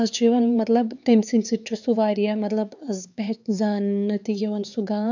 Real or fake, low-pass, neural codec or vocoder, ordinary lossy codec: fake; 7.2 kHz; codec, 16 kHz, 4 kbps, X-Codec, HuBERT features, trained on LibriSpeech; none